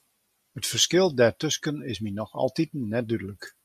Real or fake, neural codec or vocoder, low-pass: real; none; 14.4 kHz